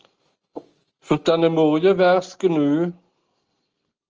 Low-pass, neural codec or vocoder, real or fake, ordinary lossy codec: 7.2 kHz; none; real; Opus, 24 kbps